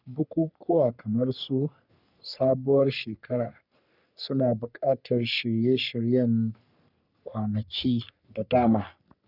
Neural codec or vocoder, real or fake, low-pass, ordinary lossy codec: codec, 44.1 kHz, 3.4 kbps, Pupu-Codec; fake; 5.4 kHz; none